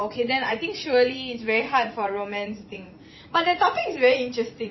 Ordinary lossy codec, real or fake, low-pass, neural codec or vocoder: MP3, 24 kbps; fake; 7.2 kHz; vocoder, 44.1 kHz, 80 mel bands, Vocos